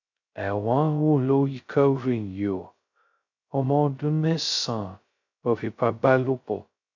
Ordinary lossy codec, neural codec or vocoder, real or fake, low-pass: none; codec, 16 kHz, 0.2 kbps, FocalCodec; fake; 7.2 kHz